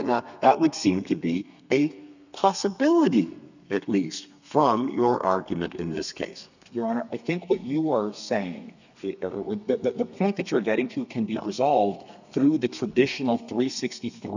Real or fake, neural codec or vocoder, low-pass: fake; codec, 32 kHz, 1.9 kbps, SNAC; 7.2 kHz